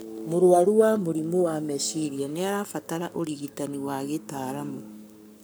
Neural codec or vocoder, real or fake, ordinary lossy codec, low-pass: codec, 44.1 kHz, 7.8 kbps, Pupu-Codec; fake; none; none